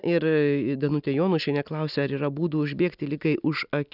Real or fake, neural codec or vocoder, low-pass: real; none; 5.4 kHz